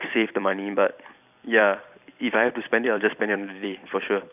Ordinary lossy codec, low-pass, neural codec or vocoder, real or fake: none; 3.6 kHz; vocoder, 44.1 kHz, 128 mel bands every 256 samples, BigVGAN v2; fake